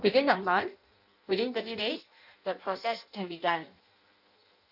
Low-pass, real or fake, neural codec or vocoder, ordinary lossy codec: 5.4 kHz; fake; codec, 16 kHz in and 24 kHz out, 0.6 kbps, FireRedTTS-2 codec; none